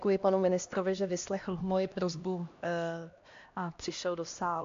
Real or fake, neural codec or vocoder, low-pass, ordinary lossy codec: fake; codec, 16 kHz, 1 kbps, X-Codec, HuBERT features, trained on LibriSpeech; 7.2 kHz; AAC, 48 kbps